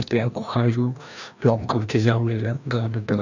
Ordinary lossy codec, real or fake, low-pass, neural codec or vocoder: none; fake; 7.2 kHz; codec, 16 kHz, 1 kbps, FreqCodec, larger model